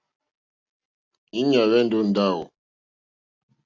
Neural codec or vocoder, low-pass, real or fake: none; 7.2 kHz; real